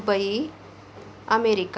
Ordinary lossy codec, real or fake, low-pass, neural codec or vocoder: none; real; none; none